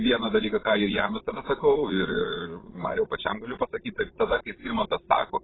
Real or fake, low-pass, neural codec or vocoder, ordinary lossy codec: fake; 7.2 kHz; vocoder, 22.05 kHz, 80 mel bands, Vocos; AAC, 16 kbps